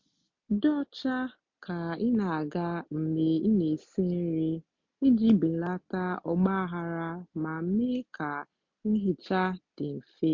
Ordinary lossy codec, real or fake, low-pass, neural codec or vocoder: MP3, 48 kbps; real; 7.2 kHz; none